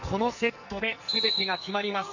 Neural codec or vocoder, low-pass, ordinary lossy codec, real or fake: codec, 44.1 kHz, 2.6 kbps, SNAC; 7.2 kHz; none; fake